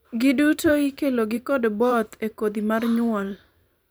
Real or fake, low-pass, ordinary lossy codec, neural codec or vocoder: fake; none; none; vocoder, 44.1 kHz, 128 mel bands every 512 samples, BigVGAN v2